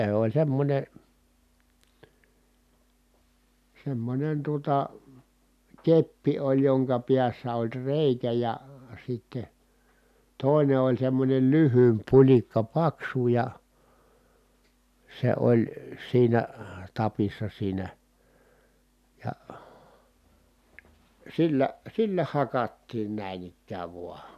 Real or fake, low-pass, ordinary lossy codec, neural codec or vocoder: real; 14.4 kHz; none; none